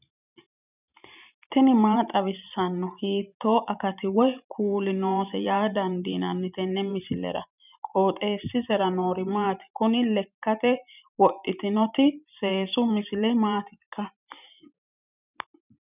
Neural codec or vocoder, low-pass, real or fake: vocoder, 44.1 kHz, 128 mel bands every 512 samples, BigVGAN v2; 3.6 kHz; fake